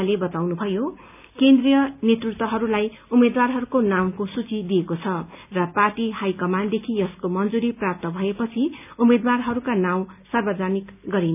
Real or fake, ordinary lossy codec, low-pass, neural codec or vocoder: real; none; 3.6 kHz; none